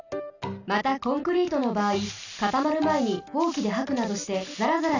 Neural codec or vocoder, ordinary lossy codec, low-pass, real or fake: none; none; 7.2 kHz; real